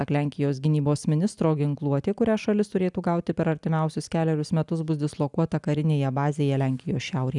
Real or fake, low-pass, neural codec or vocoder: real; 10.8 kHz; none